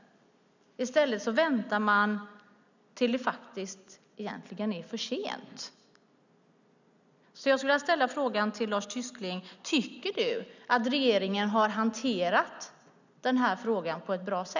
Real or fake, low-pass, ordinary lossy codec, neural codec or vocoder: real; 7.2 kHz; none; none